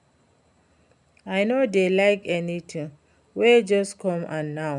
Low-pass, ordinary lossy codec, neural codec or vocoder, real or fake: 10.8 kHz; MP3, 96 kbps; none; real